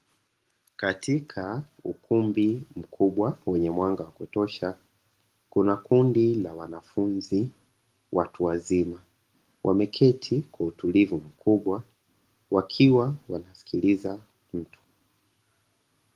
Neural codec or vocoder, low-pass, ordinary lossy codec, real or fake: none; 14.4 kHz; Opus, 24 kbps; real